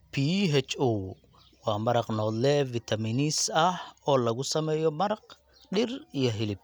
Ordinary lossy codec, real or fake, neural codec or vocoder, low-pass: none; real; none; none